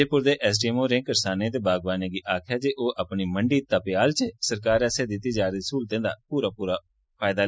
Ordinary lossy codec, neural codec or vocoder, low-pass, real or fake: none; none; none; real